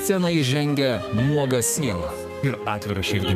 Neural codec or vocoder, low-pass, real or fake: codec, 32 kHz, 1.9 kbps, SNAC; 14.4 kHz; fake